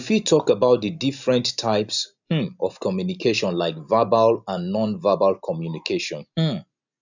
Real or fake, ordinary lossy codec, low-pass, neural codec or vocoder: real; none; 7.2 kHz; none